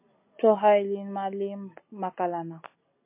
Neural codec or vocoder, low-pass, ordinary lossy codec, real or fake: none; 3.6 kHz; MP3, 24 kbps; real